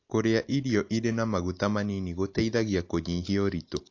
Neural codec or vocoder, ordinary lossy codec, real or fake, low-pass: none; AAC, 48 kbps; real; 7.2 kHz